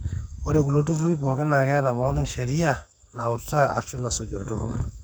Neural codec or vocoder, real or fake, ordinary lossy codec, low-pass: codec, 44.1 kHz, 2.6 kbps, SNAC; fake; none; none